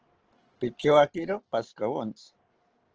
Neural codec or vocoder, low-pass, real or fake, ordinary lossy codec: none; 7.2 kHz; real; Opus, 16 kbps